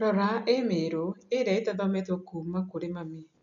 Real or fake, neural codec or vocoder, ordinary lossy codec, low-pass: real; none; none; 7.2 kHz